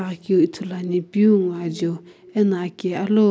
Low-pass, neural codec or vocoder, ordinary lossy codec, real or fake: none; none; none; real